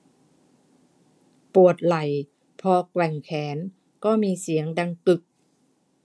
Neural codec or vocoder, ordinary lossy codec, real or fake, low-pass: none; none; real; none